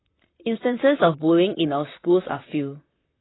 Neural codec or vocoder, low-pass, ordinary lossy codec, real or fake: vocoder, 44.1 kHz, 128 mel bands, Pupu-Vocoder; 7.2 kHz; AAC, 16 kbps; fake